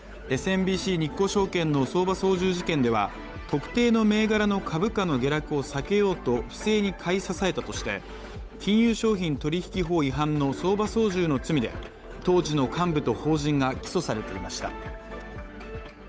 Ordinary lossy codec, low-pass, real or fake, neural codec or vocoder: none; none; fake; codec, 16 kHz, 8 kbps, FunCodec, trained on Chinese and English, 25 frames a second